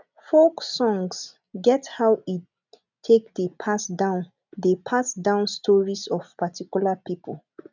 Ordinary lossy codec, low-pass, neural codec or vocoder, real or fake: none; 7.2 kHz; none; real